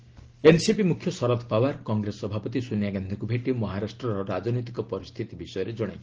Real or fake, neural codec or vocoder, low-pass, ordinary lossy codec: real; none; 7.2 kHz; Opus, 16 kbps